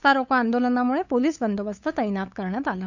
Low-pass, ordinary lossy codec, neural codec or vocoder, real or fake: 7.2 kHz; none; codec, 16 kHz, 8 kbps, FunCodec, trained on LibriTTS, 25 frames a second; fake